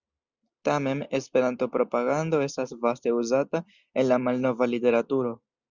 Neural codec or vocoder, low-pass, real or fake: none; 7.2 kHz; real